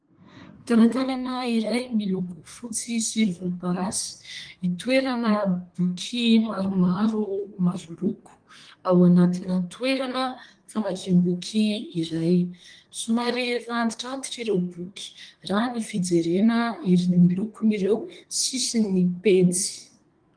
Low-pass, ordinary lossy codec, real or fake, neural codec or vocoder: 9.9 kHz; Opus, 24 kbps; fake; codec, 24 kHz, 1 kbps, SNAC